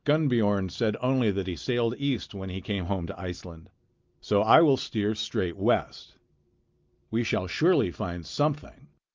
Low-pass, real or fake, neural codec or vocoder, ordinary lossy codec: 7.2 kHz; real; none; Opus, 24 kbps